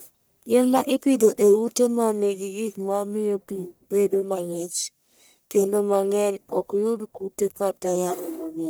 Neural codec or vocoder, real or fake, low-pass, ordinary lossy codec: codec, 44.1 kHz, 1.7 kbps, Pupu-Codec; fake; none; none